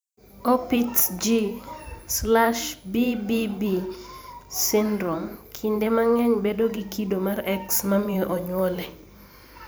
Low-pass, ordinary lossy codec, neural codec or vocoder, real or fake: none; none; vocoder, 44.1 kHz, 128 mel bands every 512 samples, BigVGAN v2; fake